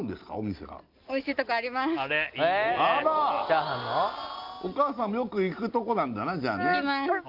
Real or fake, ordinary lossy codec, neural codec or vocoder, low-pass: real; Opus, 24 kbps; none; 5.4 kHz